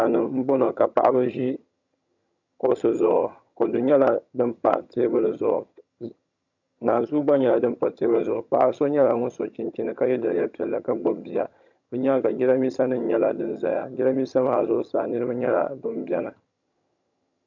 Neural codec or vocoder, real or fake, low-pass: vocoder, 22.05 kHz, 80 mel bands, HiFi-GAN; fake; 7.2 kHz